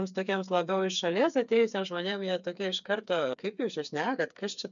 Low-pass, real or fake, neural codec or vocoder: 7.2 kHz; fake; codec, 16 kHz, 4 kbps, FreqCodec, smaller model